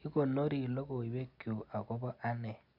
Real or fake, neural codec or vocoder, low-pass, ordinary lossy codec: real; none; 5.4 kHz; none